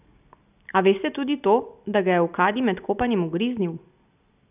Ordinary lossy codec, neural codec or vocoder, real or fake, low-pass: none; none; real; 3.6 kHz